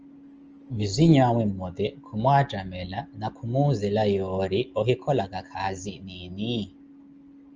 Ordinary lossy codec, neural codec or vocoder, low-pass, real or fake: Opus, 24 kbps; none; 7.2 kHz; real